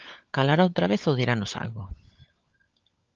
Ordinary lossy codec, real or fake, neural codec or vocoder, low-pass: Opus, 24 kbps; fake; codec, 16 kHz, 16 kbps, FunCodec, trained on LibriTTS, 50 frames a second; 7.2 kHz